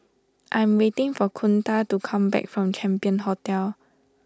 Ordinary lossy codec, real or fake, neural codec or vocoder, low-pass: none; real; none; none